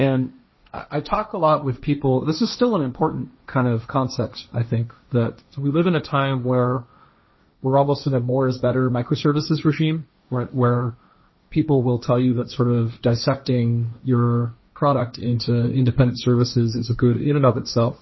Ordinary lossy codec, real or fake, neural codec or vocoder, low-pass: MP3, 24 kbps; fake; codec, 16 kHz, 1.1 kbps, Voila-Tokenizer; 7.2 kHz